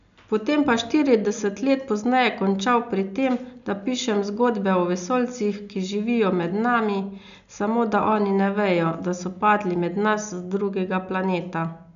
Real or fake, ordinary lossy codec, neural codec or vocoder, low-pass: real; none; none; 7.2 kHz